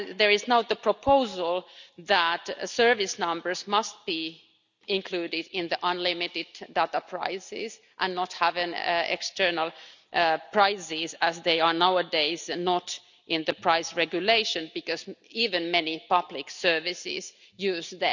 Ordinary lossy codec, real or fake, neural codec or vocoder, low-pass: none; real; none; 7.2 kHz